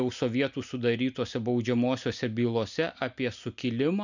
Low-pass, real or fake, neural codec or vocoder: 7.2 kHz; real; none